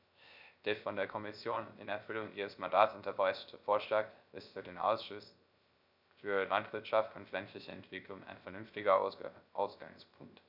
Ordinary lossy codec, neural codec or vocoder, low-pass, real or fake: none; codec, 16 kHz, 0.3 kbps, FocalCodec; 5.4 kHz; fake